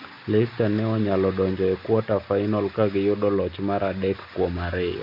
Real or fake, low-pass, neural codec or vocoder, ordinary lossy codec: real; 5.4 kHz; none; MP3, 48 kbps